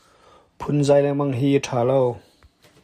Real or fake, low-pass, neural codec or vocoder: real; 14.4 kHz; none